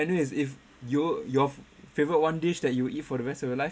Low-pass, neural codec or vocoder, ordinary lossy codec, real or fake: none; none; none; real